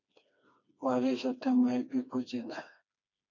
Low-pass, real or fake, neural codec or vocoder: 7.2 kHz; fake; codec, 16 kHz, 2 kbps, FreqCodec, smaller model